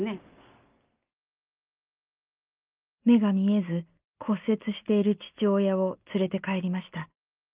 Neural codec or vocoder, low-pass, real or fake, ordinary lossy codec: none; 3.6 kHz; real; Opus, 32 kbps